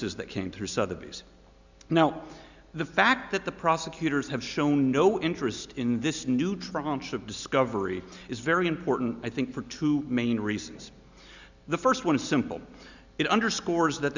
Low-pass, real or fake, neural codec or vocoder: 7.2 kHz; real; none